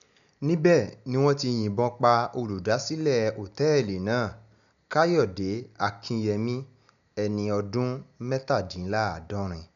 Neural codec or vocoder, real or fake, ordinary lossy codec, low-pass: none; real; none; 7.2 kHz